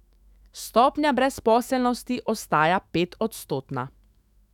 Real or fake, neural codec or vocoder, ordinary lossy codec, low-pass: fake; autoencoder, 48 kHz, 128 numbers a frame, DAC-VAE, trained on Japanese speech; none; 19.8 kHz